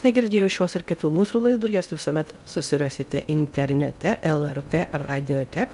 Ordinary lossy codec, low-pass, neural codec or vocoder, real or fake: AAC, 96 kbps; 10.8 kHz; codec, 16 kHz in and 24 kHz out, 0.6 kbps, FocalCodec, streaming, 2048 codes; fake